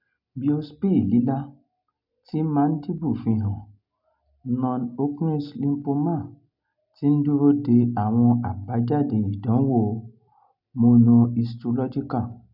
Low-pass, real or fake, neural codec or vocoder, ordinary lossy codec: 5.4 kHz; real; none; none